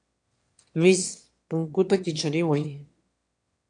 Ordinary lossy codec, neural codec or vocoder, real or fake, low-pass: AAC, 64 kbps; autoencoder, 22.05 kHz, a latent of 192 numbers a frame, VITS, trained on one speaker; fake; 9.9 kHz